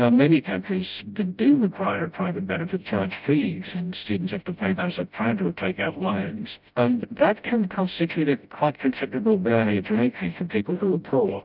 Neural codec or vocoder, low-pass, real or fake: codec, 16 kHz, 0.5 kbps, FreqCodec, smaller model; 5.4 kHz; fake